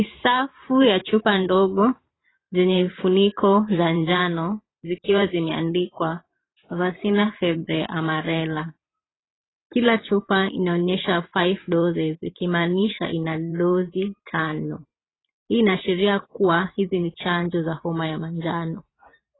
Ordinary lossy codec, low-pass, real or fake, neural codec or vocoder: AAC, 16 kbps; 7.2 kHz; fake; vocoder, 44.1 kHz, 128 mel bands every 512 samples, BigVGAN v2